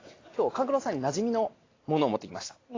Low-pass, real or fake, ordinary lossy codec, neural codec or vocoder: 7.2 kHz; real; AAC, 32 kbps; none